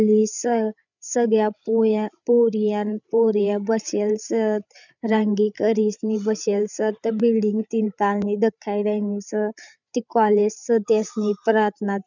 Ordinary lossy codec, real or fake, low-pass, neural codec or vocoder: none; fake; 7.2 kHz; codec, 16 kHz, 8 kbps, FreqCodec, larger model